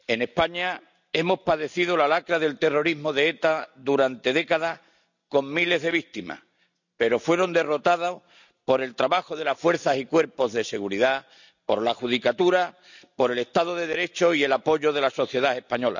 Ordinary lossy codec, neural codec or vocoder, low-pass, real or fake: none; none; 7.2 kHz; real